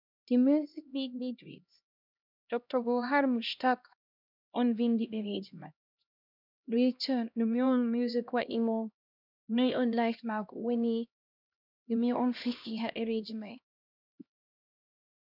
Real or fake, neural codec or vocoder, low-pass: fake; codec, 16 kHz, 1 kbps, X-Codec, HuBERT features, trained on LibriSpeech; 5.4 kHz